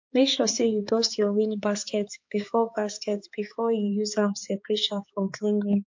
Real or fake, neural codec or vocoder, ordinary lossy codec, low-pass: fake; codec, 16 kHz, 4 kbps, X-Codec, HuBERT features, trained on balanced general audio; MP3, 48 kbps; 7.2 kHz